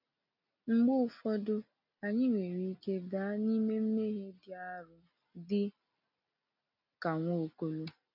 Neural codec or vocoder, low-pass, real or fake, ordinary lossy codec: none; 5.4 kHz; real; none